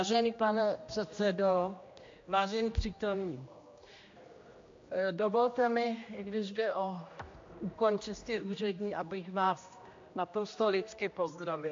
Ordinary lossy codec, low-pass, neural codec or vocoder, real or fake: MP3, 48 kbps; 7.2 kHz; codec, 16 kHz, 1 kbps, X-Codec, HuBERT features, trained on general audio; fake